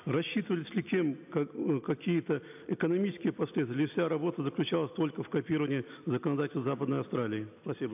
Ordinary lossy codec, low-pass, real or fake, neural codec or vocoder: AAC, 32 kbps; 3.6 kHz; real; none